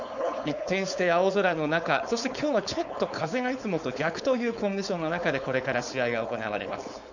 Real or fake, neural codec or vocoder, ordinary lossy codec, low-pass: fake; codec, 16 kHz, 4.8 kbps, FACodec; none; 7.2 kHz